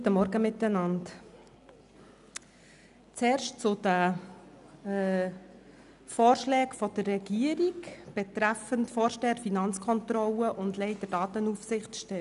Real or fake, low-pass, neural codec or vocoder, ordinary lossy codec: real; 10.8 kHz; none; none